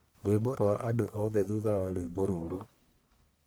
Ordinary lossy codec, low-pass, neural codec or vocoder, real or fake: none; none; codec, 44.1 kHz, 1.7 kbps, Pupu-Codec; fake